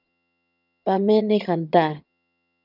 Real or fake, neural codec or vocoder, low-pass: fake; vocoder, 22.05 kHz, 80 mel bands, HiFi-GAN; 5.4 kHz